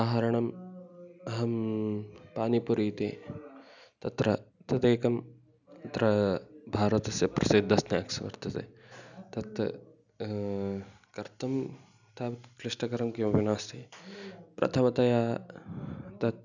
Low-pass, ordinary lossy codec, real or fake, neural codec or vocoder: 7.2 kHz; none; real; none